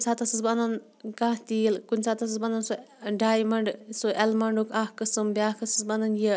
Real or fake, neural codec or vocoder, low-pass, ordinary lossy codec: real; none; none; none